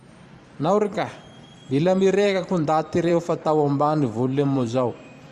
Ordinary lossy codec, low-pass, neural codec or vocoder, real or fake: Opus, 64 kbps; 14.4 kHz; vocoder, 44.1 kHz, 128 mel bands every 512 samples, BigVGAN v2; fake